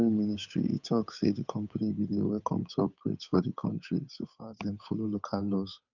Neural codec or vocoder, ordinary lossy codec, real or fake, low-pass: codec, 16 kHz, 8 kbps, FunCodec, trained on Chinese and English, 25 frames a second; none; fake; 7.2 kHz